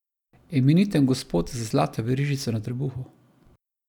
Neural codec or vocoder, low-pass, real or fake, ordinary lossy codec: none; 19.8 kHz; real; none